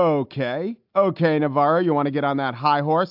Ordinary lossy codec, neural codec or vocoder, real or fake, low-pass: AAC, 48 kbps; none; real; 5.4 kHz